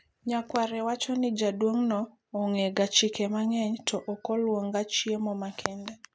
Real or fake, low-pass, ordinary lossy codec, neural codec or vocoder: real; none; none; none